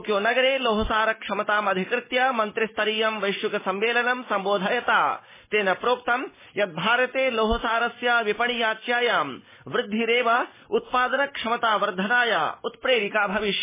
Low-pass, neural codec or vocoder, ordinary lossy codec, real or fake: 3.6 kHz; none; MP3, 16 kbps; real